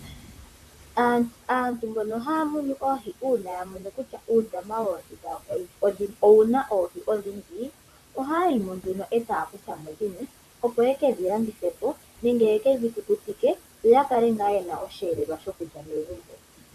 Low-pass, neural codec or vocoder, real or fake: 14.4 kHz; vocoder, 44.1 kHz, 128 mel bands, Pupu-Vocoder; fake